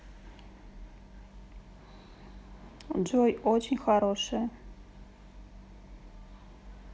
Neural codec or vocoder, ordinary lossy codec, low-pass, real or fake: none; none; none; real